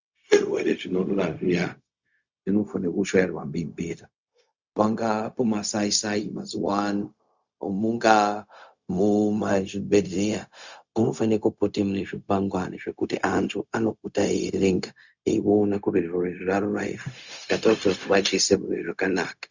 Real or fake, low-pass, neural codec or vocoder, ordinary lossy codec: fake; 7.2 kHz; codec, 16 kHz, 0.4 kbps, LongCat-Audio-Codec; Opus, 64 kbps